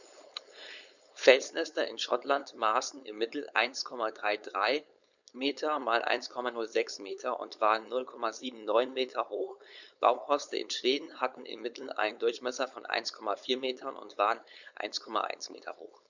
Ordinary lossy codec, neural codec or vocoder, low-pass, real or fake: none; codec, 16 kHz, 4.8 kbps, FACodec; 7.2 kHz; fake